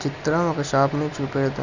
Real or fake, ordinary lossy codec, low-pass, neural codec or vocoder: real; none; 7.2 kHz; none